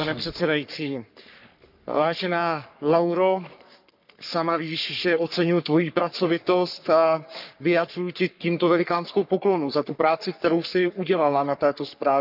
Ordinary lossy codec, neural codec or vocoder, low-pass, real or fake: none; codec, 44.1 kHz, 3.4 kbps, Pupu-Codec; 5.4 kHz; fake